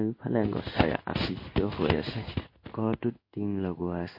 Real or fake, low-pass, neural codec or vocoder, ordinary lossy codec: fake; 5.4 kHz; codec, 16 kHz in and 24 kHz out, 1 kbps, XY-Tokenizer; MP3, 32 kbps